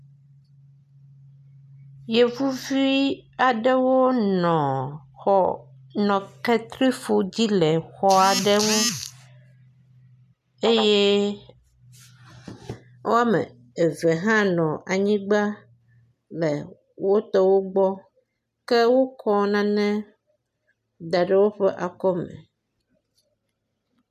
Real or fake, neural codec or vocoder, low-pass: real; none; 14.4 kHz